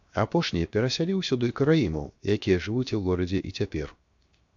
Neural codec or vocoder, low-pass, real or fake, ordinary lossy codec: codec, 16 kHz, 0.7 kbps, FocalCodec; 7.2 kHz; fake; AAC, 64 kbps